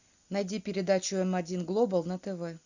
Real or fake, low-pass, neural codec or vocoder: real; 7.2 kHz; none